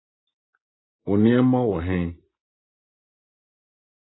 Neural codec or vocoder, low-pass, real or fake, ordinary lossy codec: none; 7.2 kHz; real; AAC, 16 kbps